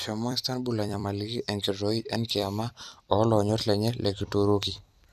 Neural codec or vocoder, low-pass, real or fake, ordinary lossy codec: vocoder, 44.1 kHz, 128 mel bands every 256 samples, BigVGAN v2; 14.4 kHz; fake; none